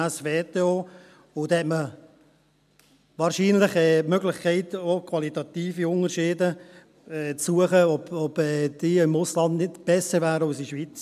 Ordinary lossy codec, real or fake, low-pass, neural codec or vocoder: MP3, 96 kbps; real; 14.4 kHz; none